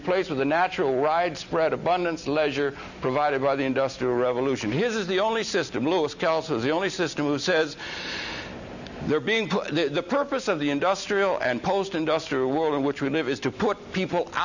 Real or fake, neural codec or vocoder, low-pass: real; none; 7.2 kHz